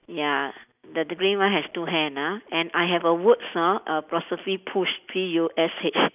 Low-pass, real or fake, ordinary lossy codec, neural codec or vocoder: 3.6 kHz; real; none; none